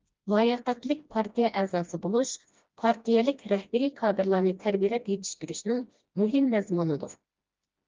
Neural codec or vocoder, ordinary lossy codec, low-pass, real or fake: codec, 16 kHz, 1 kbps, FreqCodec, smaller model; Opus, 24 kbps; 7.2 kHz; fake